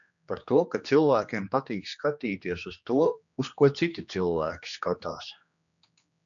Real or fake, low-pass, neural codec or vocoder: fake; 7.2 kHz; codec, 16 kHz, 2 kbps, X-Codec, HuBERT features, trained on general audio